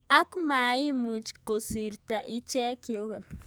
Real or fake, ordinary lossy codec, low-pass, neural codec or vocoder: fake; none; none; codec, 44.1 kHz, 2.6 kbps, SNAC